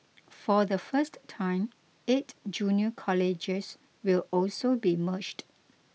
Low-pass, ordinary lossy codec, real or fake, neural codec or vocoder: none; none; real; none